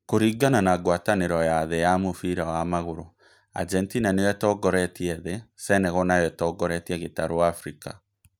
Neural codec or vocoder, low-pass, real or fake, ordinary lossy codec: none; none; real; none